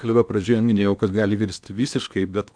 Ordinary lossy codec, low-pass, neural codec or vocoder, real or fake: MP3, 96 kbps; 9.9 kHz; codec, 16 kHz in and 24 kHz out, 0.8 kbps, FocalCodec, streaming, 65536 codes; fake